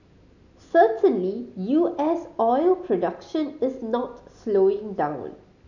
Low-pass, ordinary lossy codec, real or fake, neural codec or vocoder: 7.2 kHz; none; real; none